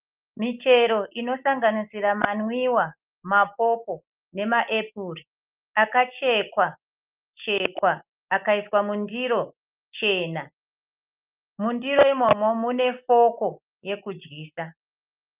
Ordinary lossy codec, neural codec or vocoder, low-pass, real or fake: Opus, 24 kbps; none; 3.6 kHz; real